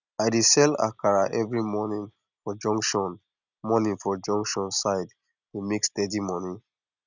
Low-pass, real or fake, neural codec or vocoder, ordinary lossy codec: 7.2 kHz; real; none; none